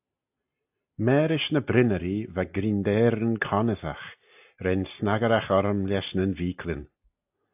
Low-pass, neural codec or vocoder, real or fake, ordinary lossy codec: 3.6 kHz; none; real; AAC, 32 kbps